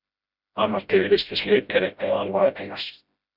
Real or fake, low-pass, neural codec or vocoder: fake; 5.4 kHz; codec, 16 kHz, 0.5 kbps, FreqCodec, smaller model